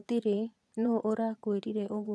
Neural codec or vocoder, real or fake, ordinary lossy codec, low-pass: vocoder, 22.05 kHz, 80 mel bands, Vocos; fake; none; none